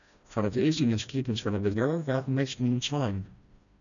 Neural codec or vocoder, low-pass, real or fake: codec, 16 kHz, 1 kbps, FreqCodec, smaller model; 7.2 kHz; fake